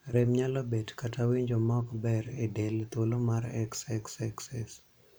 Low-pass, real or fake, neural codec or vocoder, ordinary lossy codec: none; fake; vocoder, 44.1 kHz, 128 mel bands every 512 samples, BigVGAN v2; none